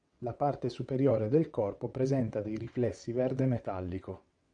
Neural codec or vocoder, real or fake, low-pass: vocoder, 22.05 kHz, 80 mel bands, WaveNeXt; fake; 9.9 kHz